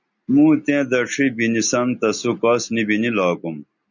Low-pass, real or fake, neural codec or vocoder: 7.2 kHz; real; none